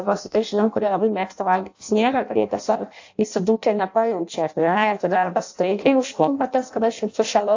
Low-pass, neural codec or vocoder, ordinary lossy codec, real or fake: 7.2 kHz; codec, 16 kHz in and 24 kHz out, 0.6 kbps, FireRedTTS-2 codec; AAC, 48 kbps; fake